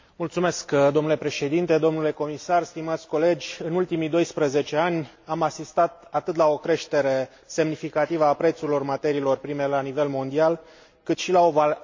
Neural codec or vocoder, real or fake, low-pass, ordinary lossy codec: none; real; 7.2 kHz; MP3, 48 kbps